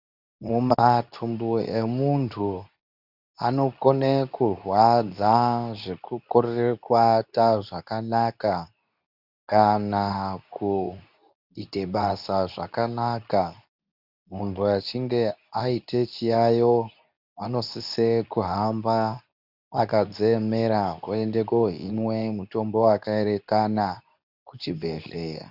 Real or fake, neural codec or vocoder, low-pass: fake; codec, 24 kHz, 0.9 kbps, WavTokenizer, medium speech release version 2; 5.4 kHz